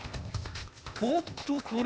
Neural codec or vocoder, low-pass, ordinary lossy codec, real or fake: codec, 16 kHz, 0.8 kbps, ZipCodec; none; none; fake